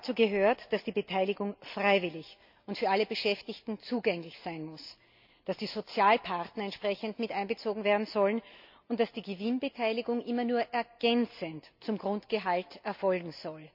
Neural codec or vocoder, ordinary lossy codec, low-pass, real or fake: none; MP3, 48 kbps; 5.4 kHz; real